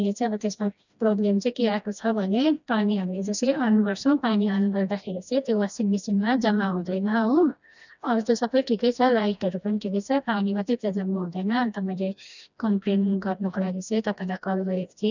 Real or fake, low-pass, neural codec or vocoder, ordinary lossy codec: fake; 7.2 kHz; codec, 16 kHz, 1 kbps, FreqCodec, smaller model; none